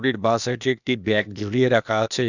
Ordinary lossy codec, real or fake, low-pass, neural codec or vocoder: none; fake; 7.2 kHz; codec, 16 kHz, 0.8 kbps, ZipCodec